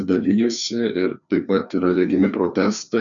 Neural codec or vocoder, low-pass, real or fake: codec, 16 kHz, 2 kbps, FreqCodec, larger model; 7.2 kHz; fake